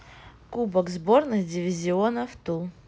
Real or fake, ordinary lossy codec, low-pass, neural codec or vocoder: real; none; none; none